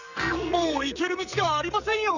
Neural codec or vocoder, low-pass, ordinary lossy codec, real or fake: codec, 44.1 kHz, 2.6 kbps, SNAC; 7.2 kHz; none; fake